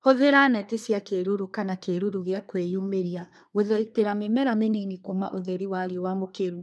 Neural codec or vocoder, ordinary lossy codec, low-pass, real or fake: codec, 24 kHz, 1 kbps, SNAC; none; none; fake